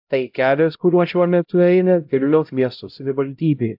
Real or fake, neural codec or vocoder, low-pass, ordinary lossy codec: fake; codec, 16 kHz, 0.5 kbps, X-Codec, HuBERT features, trained on LibriSpeech; 5.4 kHz; AAC, 48 kbps